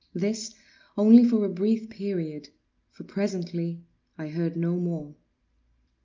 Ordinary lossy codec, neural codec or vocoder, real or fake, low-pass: Opus, 32 kbps; none; real; 7.2 kHz